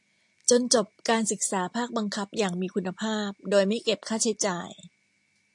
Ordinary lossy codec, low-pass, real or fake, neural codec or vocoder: AAC, 64 kbps; 10.8 kHz; real; none